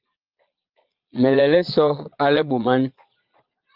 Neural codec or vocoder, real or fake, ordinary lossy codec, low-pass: vocoder, 22.05 kHz, 80 mel bands, Vocos; fake; Opus, 24 kbps; 5.4 kHz